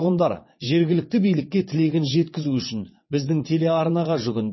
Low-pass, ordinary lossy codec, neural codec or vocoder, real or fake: 7.2 kHz; MP3, 24 kbps; vocoder, 22.05 kHz, 80 mel bands, WaveNeXt; fake